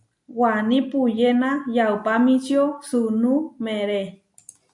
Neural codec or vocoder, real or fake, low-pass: none; real; 10.8 kHz